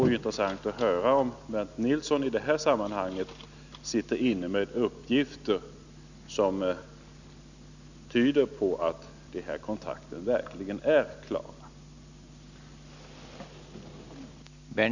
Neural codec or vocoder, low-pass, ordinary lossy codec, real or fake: none; 7.2 kHz; none; real